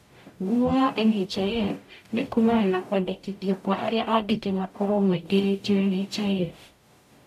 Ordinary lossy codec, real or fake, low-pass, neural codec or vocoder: AAC, 96 kbps; fake; 14.4 kHz; codec, 44.1 kHz, 0.9 kbps, DAC